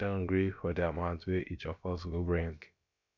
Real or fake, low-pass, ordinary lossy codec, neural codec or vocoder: fake; 7.2 kHz; none; codec, 16 kHz, about 1 kbps, DyCAST, with the encoder's durations